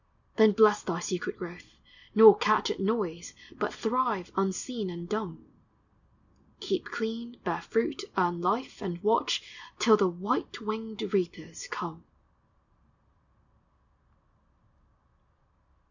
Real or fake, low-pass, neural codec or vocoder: real; 7.2 kHz; none